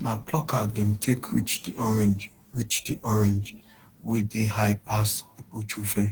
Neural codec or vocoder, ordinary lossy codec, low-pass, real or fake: codec, 44.1 kHz, 2.6 kbps, DAC; Opus, 24 kbps; 19.8 kHz; fake